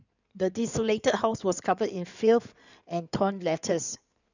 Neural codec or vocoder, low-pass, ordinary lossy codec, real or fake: codec, 16 kHz in and 24 kHz out, 2.2 kbps, FireRedTTS-2 codec; 7.2 kHz; none; fake